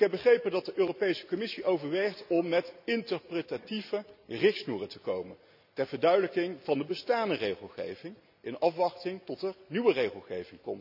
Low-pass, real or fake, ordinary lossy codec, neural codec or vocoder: 5.4 kHz; real; none; none